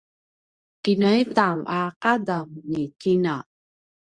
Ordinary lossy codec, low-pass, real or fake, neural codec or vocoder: Opus, 64 kbps; 9.9 kHz; fake; codec, 24 kHz, 0.9 kbps, WavTokenizer, medium speech release version 2